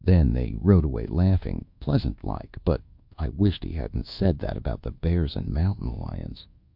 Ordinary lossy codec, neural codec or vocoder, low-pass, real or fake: MP3, 48 kbps; codec, 24 kHz, 1.2 kbps, DualCodec; 5.4 kHz; fake